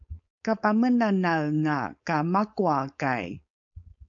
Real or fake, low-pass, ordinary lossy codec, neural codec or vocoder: fake; 7.2 kHz; AAC, 64 kbps; codec, 16 kHz, 4.8 kbps, FACodec